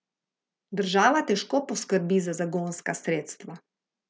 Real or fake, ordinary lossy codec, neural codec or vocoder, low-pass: real; none; none; none